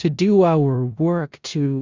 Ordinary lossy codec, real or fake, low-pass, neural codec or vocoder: Opus, 64 kbps; fake; 7.2 kHz; codec, 16 kHz in and 24 kHz out, 0.4 kbps, LongCat-Audio-Codec, four codebook decoder